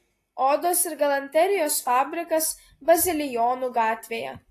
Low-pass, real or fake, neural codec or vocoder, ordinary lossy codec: 14.4 kHz; real; none; AAC, 48 kbps